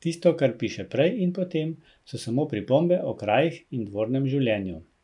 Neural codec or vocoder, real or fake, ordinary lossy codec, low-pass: none; real; none; 10.8 kHz